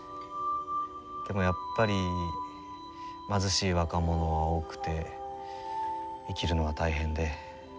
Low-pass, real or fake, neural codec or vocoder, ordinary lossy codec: none; real; none; none